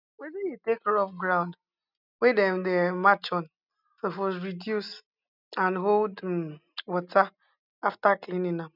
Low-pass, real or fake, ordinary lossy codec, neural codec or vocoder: 5.4 kHz; real; none; none